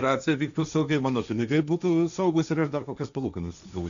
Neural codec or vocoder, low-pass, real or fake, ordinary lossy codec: codec, 16 kHz, 1.1 kbps, Voila-Tokenizer; 7.2 kHz; fake; MP3, 96 kbps